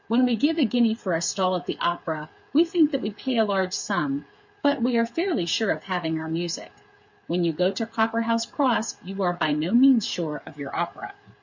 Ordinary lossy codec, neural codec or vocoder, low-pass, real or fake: MP3, 48 kbps; codec, 16 kHz, 8 kbps, FreqCodec, smaller model; 7.2 kHz; fake